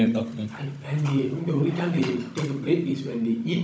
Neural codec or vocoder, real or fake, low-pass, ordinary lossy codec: codec, 16 kHz, 16 kbps, FunCodec, trained on Chinese and English, 50 frames a second; fake; none; none